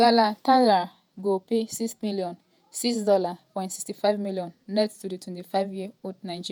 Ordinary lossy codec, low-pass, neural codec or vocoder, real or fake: none; none; vocoder, 48 kHz, 128 mel bands, Vocos; fake